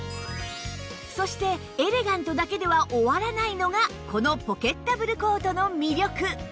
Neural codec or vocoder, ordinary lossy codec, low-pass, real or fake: none; none; none; real